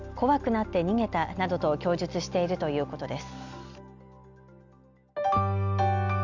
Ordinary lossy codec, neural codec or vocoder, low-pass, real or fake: none; none; 7.2 kHz; real